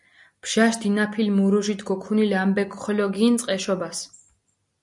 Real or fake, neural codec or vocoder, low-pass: real; none; 10.8 kHz